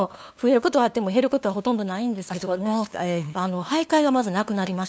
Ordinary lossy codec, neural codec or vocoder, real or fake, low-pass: none; codec, 16 kHz, 2 kbps, FunCodec, trained on LibriTTS, 25 frames a second; fake; none